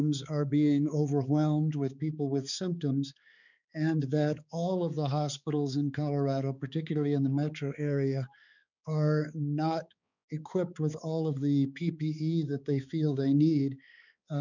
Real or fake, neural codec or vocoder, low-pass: fake; codec, 16 kHz, 4 kbps, X-Codec, HuBERT features, trained on balanced general audio; 7.2 kHz